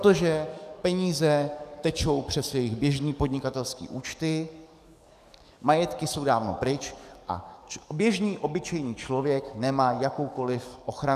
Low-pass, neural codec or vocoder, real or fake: 14.4 kHz; codec, 44.1 kHz, 7.8 kbps, DAC; fake